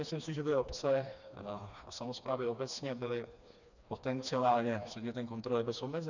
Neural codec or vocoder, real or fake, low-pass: codec, 16 kHz, 2 kbps, FreqCodec, smaller model; fake; 7.2 kHz